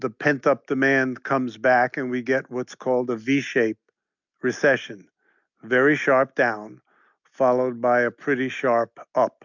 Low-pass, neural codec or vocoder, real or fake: 7.2 kHz; none; real